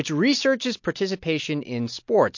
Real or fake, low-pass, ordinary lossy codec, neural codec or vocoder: real; 7.2 kHz; MP3, 48 kbps; none